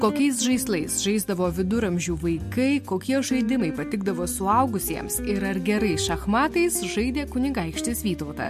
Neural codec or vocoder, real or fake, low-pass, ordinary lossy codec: none; real; 14.4 kHz; MP3, 64 kbps